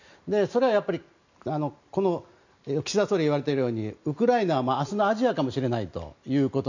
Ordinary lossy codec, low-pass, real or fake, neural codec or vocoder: none; 7.2 kHz; real; none